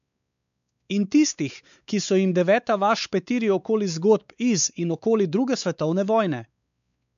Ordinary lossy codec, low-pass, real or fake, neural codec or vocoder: none; 7.2 kHz; fake; codec, 16 kHz, 4 kbps, X-Codec, WavLM features, trained on Multilingual LibriSpeech